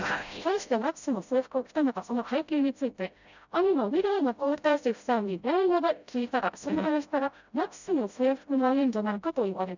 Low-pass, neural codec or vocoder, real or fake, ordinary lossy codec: 7.2 kHz; codec, 16 kHz, 0.5 kbps, FreqCodec, smaller model; fake; none